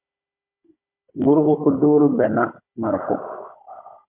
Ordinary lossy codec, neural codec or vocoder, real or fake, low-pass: MP3, 32 kbps; codec, 16 kHz, 16 kbps, FunCodec, trained on Chinese and English, 50 frames a second; fake; 3.6 kHz